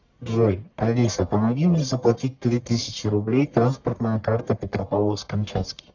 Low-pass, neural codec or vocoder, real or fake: 7.2 kHz; codec, 44.1 kHz, 1.7 kbps, Pupu-Codec; fake